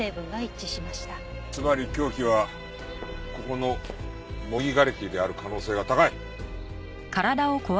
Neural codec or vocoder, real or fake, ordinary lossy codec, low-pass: none; real; none; none